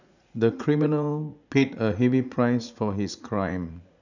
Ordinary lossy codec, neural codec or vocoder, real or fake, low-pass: none; vocoder, 44.1 kHz, 80 mel bands, Vocos; fake; 7.2 kHz